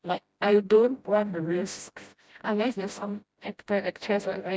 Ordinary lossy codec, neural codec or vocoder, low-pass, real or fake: none; codec, 16 kHz, 0.5 kbps, FreqCodec, smaller model; none; fake